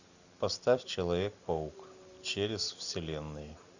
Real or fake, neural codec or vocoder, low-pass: real; none; 7.2 kHz